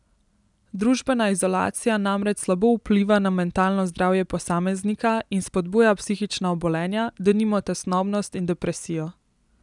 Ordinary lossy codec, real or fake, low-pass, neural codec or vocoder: none; real; 10.8 kHz; none